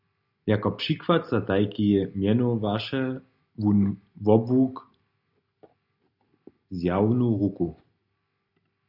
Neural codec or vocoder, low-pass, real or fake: none; 5.4 kHz; real